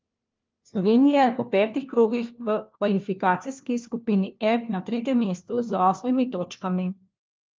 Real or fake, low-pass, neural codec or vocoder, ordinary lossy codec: fake; 7.2 kHz; codec, 16 kHz, 1 kbps, FunCodec, trained on LibriTTS, 50 frames a second; Opus, 24 kbps